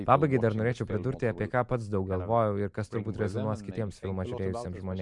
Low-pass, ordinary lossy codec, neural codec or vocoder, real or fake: 10.8 kHz; MP3, 64 kbps; none; real